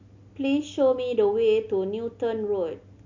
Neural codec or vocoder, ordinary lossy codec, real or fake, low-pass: none; MP3, 64 kbps; real; 7.2 kHz